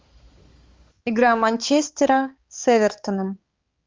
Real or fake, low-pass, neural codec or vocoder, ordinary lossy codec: fake; 7.2 kHz; codec, 16 kHz, 4 kbps, X-Codec, HuBERT features, trained on balanced general audio; Opus, 32 kbps